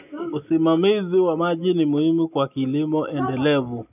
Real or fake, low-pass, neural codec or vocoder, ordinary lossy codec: real; 3.6 kHz; none; none